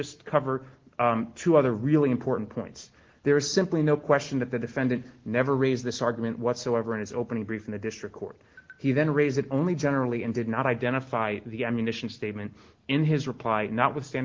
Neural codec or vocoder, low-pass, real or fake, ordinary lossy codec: none; 7.2 kHz; real; Opus, 16 kbps